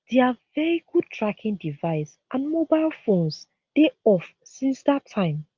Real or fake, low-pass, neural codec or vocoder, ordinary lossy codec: real; 7.2 kHz; none; Opus, 24 kbps